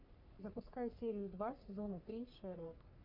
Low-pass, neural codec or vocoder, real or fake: 5.4 kHz; codec, 44.1 kHz, 3.4 kbps, Pupu-Codec; fake